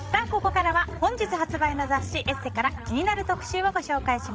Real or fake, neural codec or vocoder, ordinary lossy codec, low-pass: fake; codec, 16 kHz, 16 kbps, FreqCodec, larger model; none; none